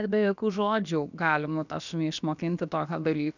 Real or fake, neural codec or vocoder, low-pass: fake; codec, 16 kHz, about 1 kbps, DyCAST, with the encoder's durations; 7.2 kHz